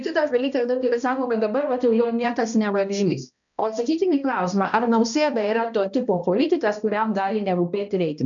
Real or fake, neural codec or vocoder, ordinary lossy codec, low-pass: fake; codec, 16 kHz, 1 kbps, X-Codec, HuBERT features, trained on balanced general audio; MP3, 96 kbps; 7.2 kHz